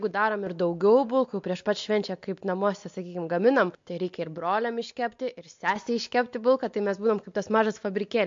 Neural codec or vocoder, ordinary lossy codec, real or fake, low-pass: none; MP3, 48 kbps; real; 7.2 kHz